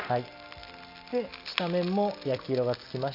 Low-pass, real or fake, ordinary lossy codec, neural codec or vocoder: 5.4 kHz; real; none; none